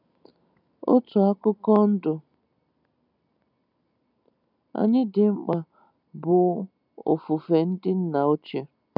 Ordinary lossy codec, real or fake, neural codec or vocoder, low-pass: none; real; none; 5.4 kHz